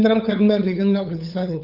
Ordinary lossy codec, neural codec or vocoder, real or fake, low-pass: Opus, 24 kbps; codec, 16 kHz, 8 kbps, FunCodec, trained on LibriTTS, 25 frames a second; fake; 5.4 kHz